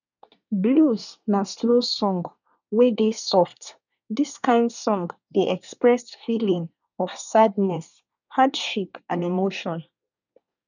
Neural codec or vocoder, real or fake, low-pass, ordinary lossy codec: codec, 24 kHz, 1 kbps, SNAC; fake; 7.2 kHz; none